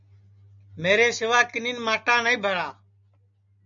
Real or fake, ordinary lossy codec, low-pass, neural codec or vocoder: real; MP3, 64 kbps; 7.2 kHz; none